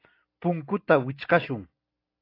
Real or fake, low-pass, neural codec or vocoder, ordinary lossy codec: real; 5.4 kHz; none; AAC, 32 kbps